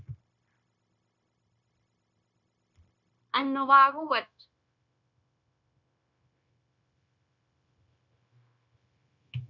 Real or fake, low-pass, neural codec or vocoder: fake; 7.2 kHz; codec, 16 kHz, 0.9 kbps, LongCat-Audio-Codec